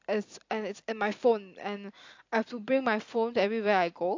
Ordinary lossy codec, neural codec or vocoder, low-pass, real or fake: AAC, 48 kbps; none; 7.2 kHz; real